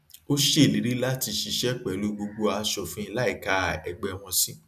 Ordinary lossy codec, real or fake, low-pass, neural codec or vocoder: none; real; 14.4 kHz; none